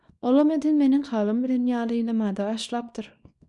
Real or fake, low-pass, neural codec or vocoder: fake; 10.8 kHz; codec, 24 kHz, 0.9 kbps, WavTokenizer, small release